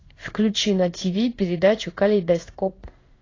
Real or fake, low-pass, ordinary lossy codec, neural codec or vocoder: fake; 7.2 kHz; AAC, 32 kbps; codec, 16 kHz in and 24 kHz out, 1 kbps, XY-Tokenizer